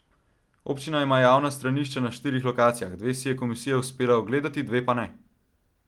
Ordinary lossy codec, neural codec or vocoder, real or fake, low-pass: Opus, 24 kbps; none; real; 19.8 kHz